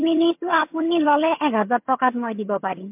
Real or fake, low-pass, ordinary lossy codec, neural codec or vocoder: fake; 3.6 kHz; MP3, 32 kbps; vocoder, 22.05 kHz, 80 mel bands, HiFi-GAN